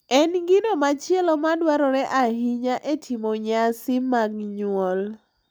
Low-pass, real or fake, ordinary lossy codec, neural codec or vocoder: none; real; none; none